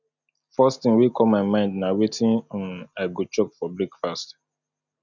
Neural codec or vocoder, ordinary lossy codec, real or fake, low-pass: none; none; real; 7.2 kHz